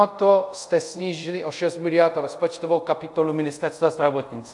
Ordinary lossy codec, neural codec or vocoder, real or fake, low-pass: AAC, 64 kbps; codec, 24 kHz, 0.5 kbps, DualCodec; fake; 10.8 kHz